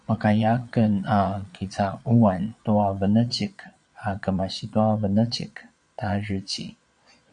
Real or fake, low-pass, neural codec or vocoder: fake; 9.9 kHz; vocoder, 22.05 kHz, 80 mel bands, Vocos